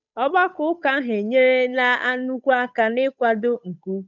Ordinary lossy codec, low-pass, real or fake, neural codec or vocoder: none; 7.2 kHz; fake; codec, 16 kHz, 8 kbps, FunCodec, trained on Chinese and English, 25 frames a second